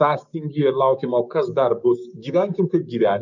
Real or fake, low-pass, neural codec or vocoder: fake; 7.2 kHz; codec, 16 kHz, 6 kbps, DAC